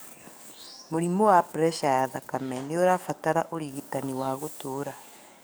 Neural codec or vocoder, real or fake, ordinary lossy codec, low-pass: codec, 44.1 kHz, 7.8 kbps, DAC; fake; none; none